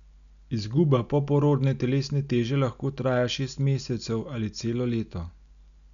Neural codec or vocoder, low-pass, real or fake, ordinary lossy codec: none; 7.2 kHz; real; none